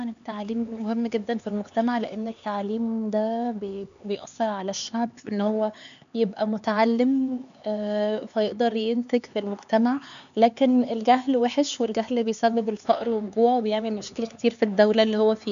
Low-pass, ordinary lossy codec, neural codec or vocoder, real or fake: 7.2 kHz; none; codec, 16 kHz, 4 kbps, X-Codec, HuBERT features, trained on LibriSpeech; fake